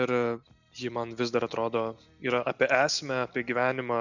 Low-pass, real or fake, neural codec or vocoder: 7.2 kHz; real; none